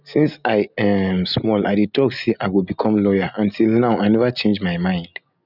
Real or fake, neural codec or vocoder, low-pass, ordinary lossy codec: real; none; 5.4 kHz; none